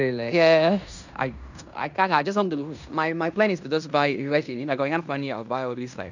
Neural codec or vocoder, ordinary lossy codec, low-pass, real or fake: codec, 16 kHz in and 24 kHz out, 0.9 kbps, LongCat-Audio-Codec, fine tuned four codebook decoder; none; 7.2 kHz; fake